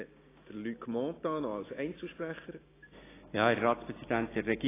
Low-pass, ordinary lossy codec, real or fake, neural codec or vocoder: 3.6 kHz; AAC, 16 kbps; real; none